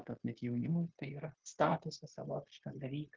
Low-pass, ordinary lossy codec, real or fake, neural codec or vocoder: 7.2 kHz; Opus, 16 kbps; fake; codec, 16 kHz, 0.9 kbps, LongCat-Audio-Codec